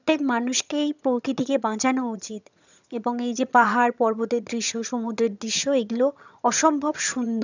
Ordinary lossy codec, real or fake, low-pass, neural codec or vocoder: none; fake; 7.2 kHz; vocoder, 22.05 kHz, 80 mel bands, HiFi-GAN